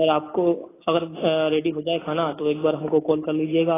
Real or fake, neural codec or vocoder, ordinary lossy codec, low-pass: real; none; AAC, 16 kbps; 3.6 kHz